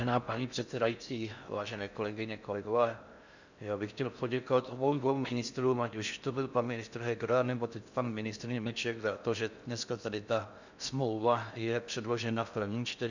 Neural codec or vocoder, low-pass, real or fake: codec, 16 kHz in and 24 kHz out, 0.6 kbps, FocalCodec, streaming, 4096 codes; 7.2 kHz; fake